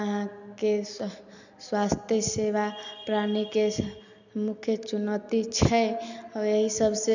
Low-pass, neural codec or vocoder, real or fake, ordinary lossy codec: 7.2 kHz; none; real; none